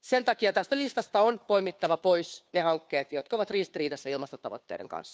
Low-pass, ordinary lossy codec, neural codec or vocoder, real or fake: none; none; codec, 16 kHz, 2 kbps, FunCodec, trained on Chinese and English, 25 frames a second; fake